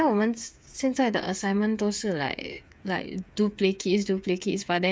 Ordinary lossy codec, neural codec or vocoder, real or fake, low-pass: none; codec, 16 kHz, 8 kbps, FreqCodec, smaller model; fake; none